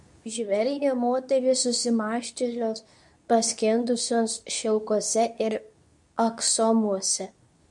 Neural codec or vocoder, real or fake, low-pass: codec, 24 kHz, 0.9 kbps, WavTokenizer, medium speech release version 2; fake; 10.8 kHz